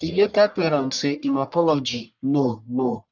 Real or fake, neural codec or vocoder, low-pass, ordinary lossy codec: fake; codec, 44.1 kHz, 1.7 kbps, Pupu-Codec; 7.2 kHz; none